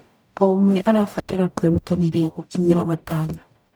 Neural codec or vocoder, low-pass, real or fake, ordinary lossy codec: codec, 44.1 kHz, 0.9 kbps, DAC; none; fake; none